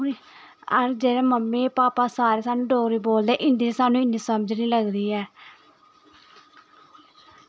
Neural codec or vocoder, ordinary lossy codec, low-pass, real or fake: none; none; none; real